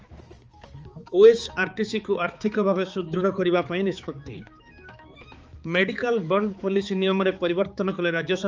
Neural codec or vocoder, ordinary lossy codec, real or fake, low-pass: codec, 16 kHz, 4 kbps, X-Codec, HuBERT features, trained on balanced general audio; Opus, 24 kbps; fake; 7.2 kHz